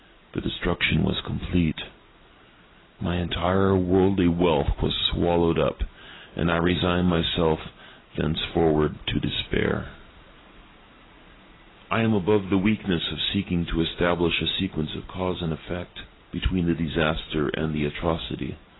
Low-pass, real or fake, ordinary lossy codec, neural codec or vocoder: 7.2 kHz; real; AAC, 16 kbps; none